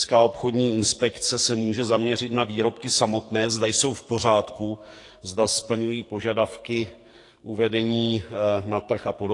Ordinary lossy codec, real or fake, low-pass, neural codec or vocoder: AAC, 48 kbps; fake; 10.8 kHz; codec, 44.1 kHz, 2.6 kbps, SNAC